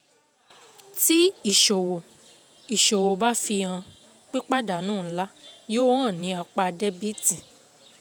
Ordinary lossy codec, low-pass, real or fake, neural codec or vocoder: none; none; fake; vocoder, 48 kHz, 128 mel bands, Vocos